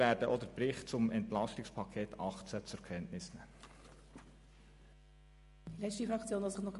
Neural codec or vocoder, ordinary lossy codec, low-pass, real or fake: none; MP3, 48 kbps; 14.4 kHz; real